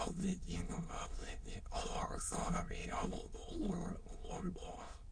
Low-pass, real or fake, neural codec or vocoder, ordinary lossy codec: 9.9 kHz; fake; autoencoder, 22.05 kHz, a latent of 192 numbers a frame, VITS, trained on many speakers; MP3, 48 kbps